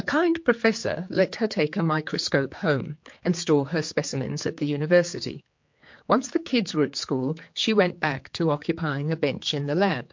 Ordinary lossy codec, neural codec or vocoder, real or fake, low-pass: MP3, 48 kbps; codec, 16 kHz, 4 kbps, X-Codec, HuBERT features, trained on general audio; fake; 7.2 kHz